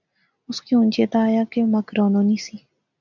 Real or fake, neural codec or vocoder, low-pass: real; none; 7.2 kHz